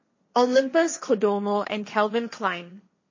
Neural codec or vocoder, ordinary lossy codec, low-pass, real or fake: codec, 16 kHz, 1.1 kbps, Voila-Tokenizer; MP3, 32 kbps; 7.2 kHz; fake